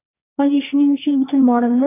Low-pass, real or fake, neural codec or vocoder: 3.6 kHz; fake; codec, 16 kHz, 1.1 kbps, Voila-Tokenizer